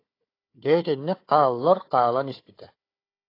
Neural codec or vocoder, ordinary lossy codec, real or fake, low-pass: codec, 16 kHz, 4 kbps, FunCodec, trained on Chinese and English, 50 frames a second; AAC, 32 kbps; fake; 5.4 kHz